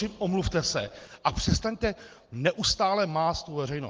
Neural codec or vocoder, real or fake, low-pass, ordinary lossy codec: none; real; 7.2 kHz; Opus, 16 kbps